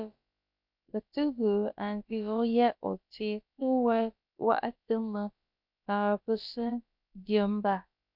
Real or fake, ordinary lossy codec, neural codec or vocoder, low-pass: fake; MP3, 48 kbps; codec, 16 kHz, about 1 kbps, DyCAST, with the encoder's durations; 5.4 kHz